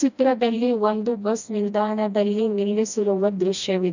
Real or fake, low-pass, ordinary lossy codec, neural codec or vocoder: fake; 7.2 kHz; none; codec, 16 kHz, 1 kbps, FreqCodec, smaller model